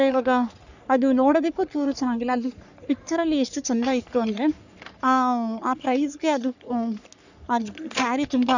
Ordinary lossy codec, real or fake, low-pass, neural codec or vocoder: none; fake; 7.2 kHz; codec, 44.1 kHz, 3.4 kbps, Pupu-Codec